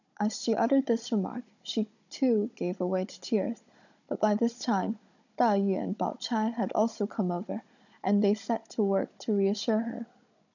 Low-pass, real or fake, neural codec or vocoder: 7.2 kHz; fake; codec, 16 kHz, 16 kbps, FunCodec, trained on Chinese and English, 50 frames a second